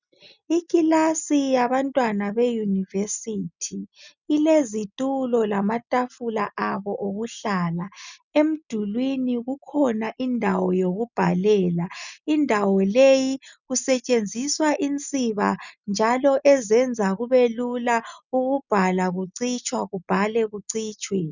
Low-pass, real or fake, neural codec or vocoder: 7.2 kHz; real; none